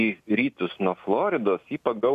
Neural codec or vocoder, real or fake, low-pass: none; real; 14.4 kHz